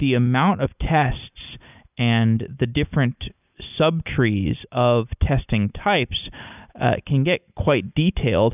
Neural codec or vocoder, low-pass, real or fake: none; 3.6 kHz; real